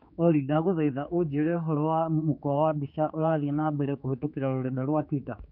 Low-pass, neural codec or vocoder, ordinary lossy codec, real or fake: 5.4 kHz; codec, 16 kHz, 4 kbps, X-Codec, HuBERT features, trained on general audio; none; fake